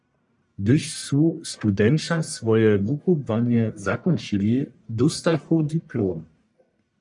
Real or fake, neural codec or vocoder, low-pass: fake; codec, 44.1 kHz, 1.7 kbps, Pupu-Codec; 10.8 kHz